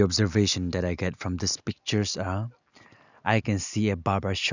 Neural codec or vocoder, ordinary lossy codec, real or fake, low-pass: none; none; real; 7.2 kHz